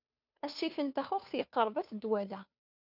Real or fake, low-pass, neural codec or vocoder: fake; 5.4 kHz; codec, 16 kHz, 2 kbps, FunCodec, trained on Chinese and English, 25 frames a second